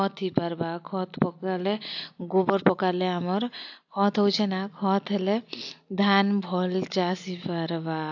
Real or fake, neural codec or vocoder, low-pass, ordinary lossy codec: real; none; 7.2 kHz; AAC, 48 kbps